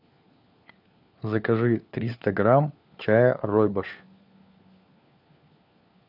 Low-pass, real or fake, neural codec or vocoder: 5.4 kHz; fake; codec, 16 kHz, 4 kbps, FunCodec, trained on LibriTTS, 50 frames a second